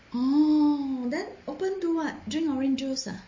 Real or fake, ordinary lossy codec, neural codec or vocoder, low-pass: real; MP3, 32 kbps; none; 7.2 kHz